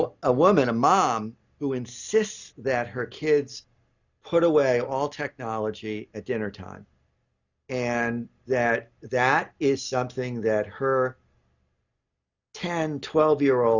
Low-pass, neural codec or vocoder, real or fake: 7.2 kHz; none; real